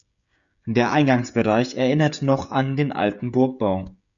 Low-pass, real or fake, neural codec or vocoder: 7.2 kHz; fake; codec, 16 kHz, 16 kbps, FreqCodec, smaller model